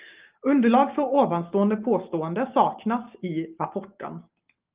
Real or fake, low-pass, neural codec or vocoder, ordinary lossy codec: real; 3.6 kHz; none; Opus, 32 kbps